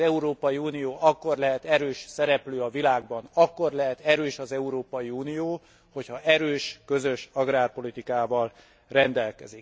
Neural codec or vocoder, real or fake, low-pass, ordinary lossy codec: none; real; none; none